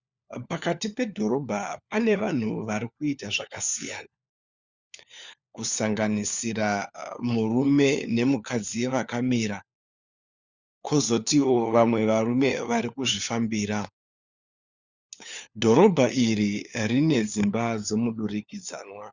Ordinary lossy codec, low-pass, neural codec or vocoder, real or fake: Opus, 64 kbps; 7.2 kHz; codec, 16 kHz, 4 kbps, FunCodec, trained on LibriTTS, 50 frames a second; fake